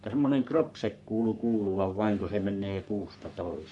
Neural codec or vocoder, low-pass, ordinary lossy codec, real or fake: codec, 44.1 kHz, 3.4 kbps, Pupu-Codec; 10.8 kHz; none; fake